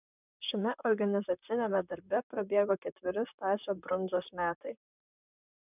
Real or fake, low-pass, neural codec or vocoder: fake; 3.6 kHz; vocoder, 44.1 kHz, 128 mel bands, Pupu-Vocoder